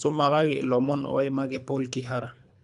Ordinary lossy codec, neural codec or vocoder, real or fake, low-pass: none; codec, 24 kHz, 3 kbps, HILCodec; fake; 10.8 kHz